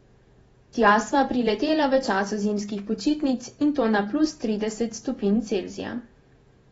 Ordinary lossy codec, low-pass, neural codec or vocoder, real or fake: AAC, 24 kbps; 19.8 kHz; vocoder, 48 kHz, 128 mel bands, Vocos; fake